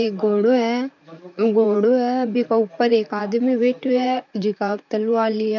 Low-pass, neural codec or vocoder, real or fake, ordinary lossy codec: 7.2 kHz; vocoder, 44.1 kHz, 128 mel bands, Pupu-Vocoder; fake; none